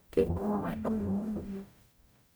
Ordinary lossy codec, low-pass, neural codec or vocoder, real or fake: none; none; codec, 44.1 kHz, 0.9 kbps, DAC; fake